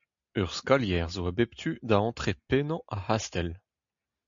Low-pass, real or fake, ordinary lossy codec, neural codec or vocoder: 7.2 kHz; real; MP3, 64 kbps; none